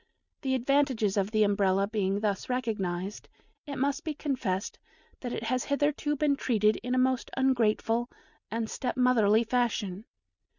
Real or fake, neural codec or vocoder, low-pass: real; none; 7.2 kHz